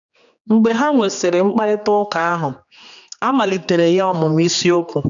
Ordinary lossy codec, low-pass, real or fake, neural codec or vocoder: none; 7.2 kHz; fake; codec, 16 kHz, 2 kbps, X-Codec, HuBERT features, trained on general audio